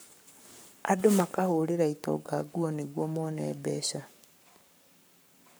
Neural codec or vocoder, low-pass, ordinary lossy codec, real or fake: codec, 44.1 kHz, 7.8 kbps, Pupu-Codec; none; none; fake